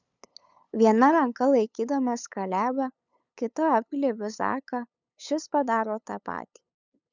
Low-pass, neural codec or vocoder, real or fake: 7.2 kHz; codec, 16 kHz, 8 kbps, FunCodec, trained on LibriTTS, 25 frames a second; fake